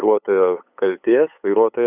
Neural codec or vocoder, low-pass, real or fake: codec, 16 kHz, 8 kbps, FunCodec, trained on LibriTTS, 25 frames a second; 3.6 kHz; fake